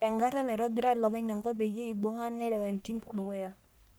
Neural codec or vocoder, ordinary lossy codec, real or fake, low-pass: codec, 44.1 kHz, 1.7 kbps, Pupu-Codec; none; fake; none